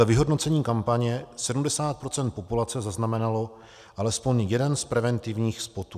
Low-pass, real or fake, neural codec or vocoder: 14.4 kHz; real; none